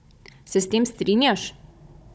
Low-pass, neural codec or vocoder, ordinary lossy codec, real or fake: none; codec, 16 kHz, 16 kbps, FunCodec, trained on Chinese and English, 50 frames a second; none; fake